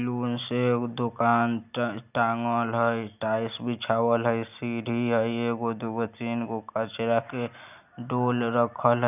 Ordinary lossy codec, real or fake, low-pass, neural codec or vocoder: none; real; 3.6 kHz; none